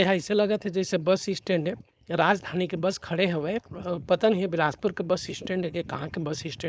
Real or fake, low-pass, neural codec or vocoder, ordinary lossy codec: fake; none; codec, 16 kHz, 4.8 kbps, FACodec; none